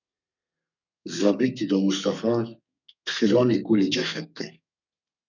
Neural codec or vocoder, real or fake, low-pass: codec, 32 kHz, 1.9 kbps, SNAC; fake; 7.2 kHz